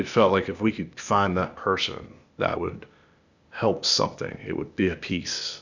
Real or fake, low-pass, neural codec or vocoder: fake; 7.2 kHz; codec, 16 kHz, 0.8 kbps, ZipCodec